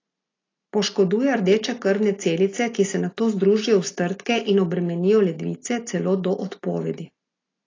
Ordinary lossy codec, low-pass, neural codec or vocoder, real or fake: AAC, 32 kbps; 7.2 kHz; none; real